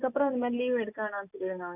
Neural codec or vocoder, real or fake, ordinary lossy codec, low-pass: none; real; none; 3.6 kHz